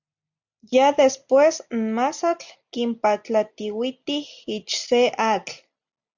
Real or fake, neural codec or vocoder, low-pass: real; none; 7.2 kHz